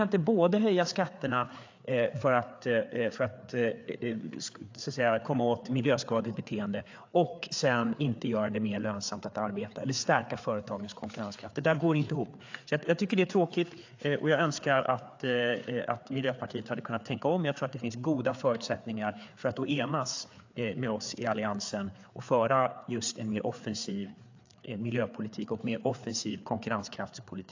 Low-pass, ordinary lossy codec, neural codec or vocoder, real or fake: 7.2 kHz; AAC, 48 kbps; codec, 16 kHz, 4 kbps, FreqCodec, larger model; fake